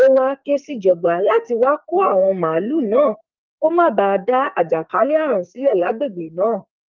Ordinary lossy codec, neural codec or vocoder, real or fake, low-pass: Opus, 24 kbps; codec, 44.1 kHz, 2.6 kbps, SNAC; fake; 7.2 kHz